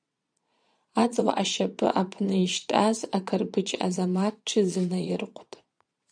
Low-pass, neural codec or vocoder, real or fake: 9.9 kHz; vocoder, 22.05 kHz, 80 mel bands, Vocos; fake